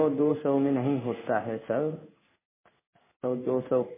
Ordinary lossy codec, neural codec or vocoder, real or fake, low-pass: MP3, 16 kbps; codec, 16 kHz in and 24 kHz out, 1 kbps, XY-Tokenizer; fake; 3.6 kHz